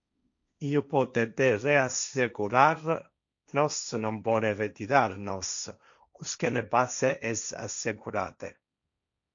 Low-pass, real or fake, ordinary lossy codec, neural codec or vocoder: 7.2 kHz; fake; MP3, 64 kbps; codec, 16 kHz, 1.1 kbps, Voila-Tokenizer